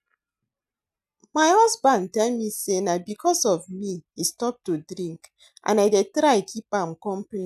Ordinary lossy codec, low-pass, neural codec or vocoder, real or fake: none; 14.4 kHz; none; real